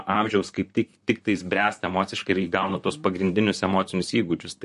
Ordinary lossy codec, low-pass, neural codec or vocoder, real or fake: MP3, 48 kbps; 14.4 kHz; vocoder, 44.1 kHz, 128 mel bands, Pupu-Vocoder; fake